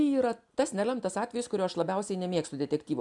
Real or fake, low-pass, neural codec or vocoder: real; 10.8 kHz; none